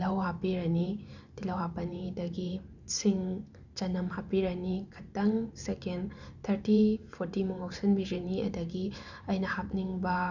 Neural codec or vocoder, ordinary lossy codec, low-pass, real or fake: vocoder, 44.1 kHz, 128 mel bands every 512 samples, BigVGAN v2; none; 7.2 kHz; fake